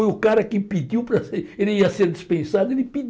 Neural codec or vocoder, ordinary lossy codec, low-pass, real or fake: none; none; none; real